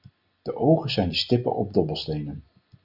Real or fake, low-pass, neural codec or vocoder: real; 5.4 kHz; none